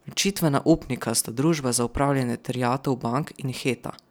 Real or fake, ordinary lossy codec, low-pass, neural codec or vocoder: real; none; none; none